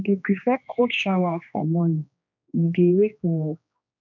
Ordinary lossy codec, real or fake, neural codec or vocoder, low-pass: none; fake; codec, 16 kHz, 2 kbps, X-Codec, HuBERT features, trained on general audio; 7.2 kHz